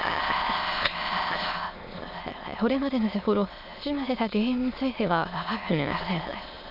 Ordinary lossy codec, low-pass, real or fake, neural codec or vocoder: AAC, 48 kbps; 5.4 kHz; fake; autoencoder, 22.05 kHz, a latent of 192 numbers a frame, VITS, trained on many speakers